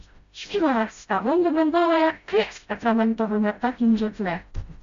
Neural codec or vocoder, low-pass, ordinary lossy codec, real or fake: codec, 16 kHz, 0.5 kbps, FreqCodec, smaller model; 7.2 kHz; MP3, 64 kbps; fake